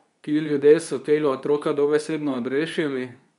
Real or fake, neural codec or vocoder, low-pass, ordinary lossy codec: fake; codec, 24 kHz, 0.9 kbps, WavTokenizer, medium speech release version 2; 10.8 kHz; none